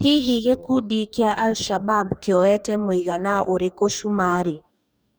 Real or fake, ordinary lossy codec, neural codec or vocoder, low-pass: fake; none; codec, 44.1 kHz, 2.6 kbps, DAC; none